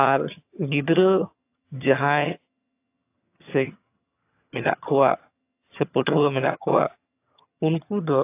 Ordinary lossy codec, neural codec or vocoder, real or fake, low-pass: AAC, 24 kbps; vocoder, 22.05 kHz, 80 mel bands, HiFi-GAN; fake; 3.6 kHz